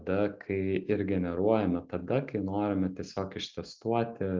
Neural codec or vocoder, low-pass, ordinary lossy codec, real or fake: none; 7.2 kHz; Opus, 24 kbps; real